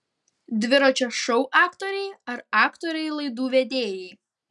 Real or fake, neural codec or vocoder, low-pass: real; none; 10.8 kHz